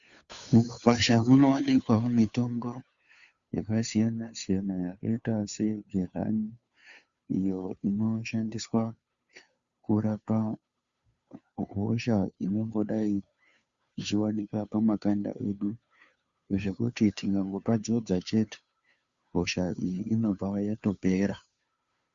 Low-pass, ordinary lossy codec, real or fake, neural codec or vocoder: 7.2 kHz; Opus, 64 kbps; fake; codec, 16 kHz, 2 kbps, FunCodec, trained on Chinese and English, 25 frames a second